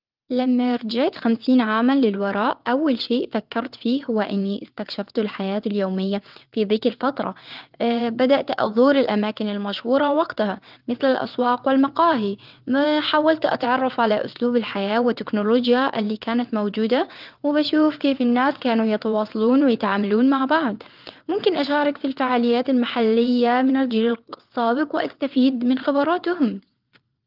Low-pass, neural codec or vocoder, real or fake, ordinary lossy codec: 5.4 kHz; vocoder, 22.05 kHz, 80 mel bands, WaveNeXt; fake; Opus, 24 kbps